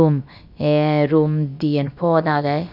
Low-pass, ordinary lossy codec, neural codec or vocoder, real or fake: 5.4 kHz; none; codec, 16 kHz, about 1 kbps, DyCAST, with the encoder's durations; fake